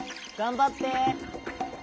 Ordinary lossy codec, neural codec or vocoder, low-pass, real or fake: none; none; none; real